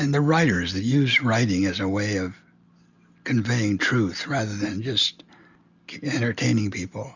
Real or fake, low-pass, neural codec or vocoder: real; 7.2 kHz; none